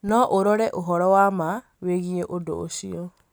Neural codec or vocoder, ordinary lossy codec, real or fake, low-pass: none; none; real; none